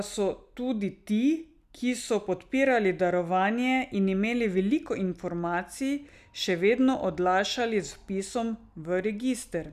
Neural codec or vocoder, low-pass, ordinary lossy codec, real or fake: none; 14.4 kHz; none; real